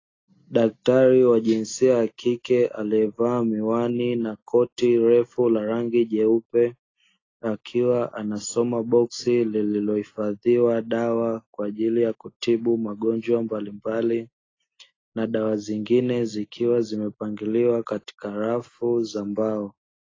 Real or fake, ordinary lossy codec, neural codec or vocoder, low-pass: real; AAC, 32 kbps; none; 7.2 kHz